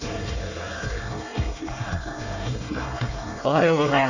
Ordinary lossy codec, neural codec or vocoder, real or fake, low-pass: none; codec, 24 kHz, 1 kbps, SNAC; fake; 7.2 kHz